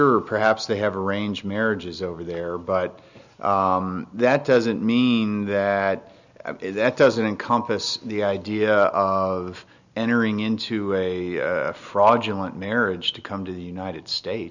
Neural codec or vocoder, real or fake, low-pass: none; real; 7.2 kHz